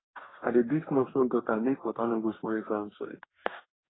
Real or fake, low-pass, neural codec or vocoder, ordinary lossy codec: fake; 7.2 kHz; codec, 44.1 kHz, 2.6 kbps, DAC; AAC, 16 kbps